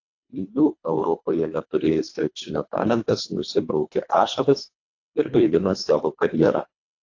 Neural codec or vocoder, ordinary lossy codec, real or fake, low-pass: codec, 24 kHz, 1.5 kbps, HILCodec; AAC, 48 kbps; fake; 7.2 kHz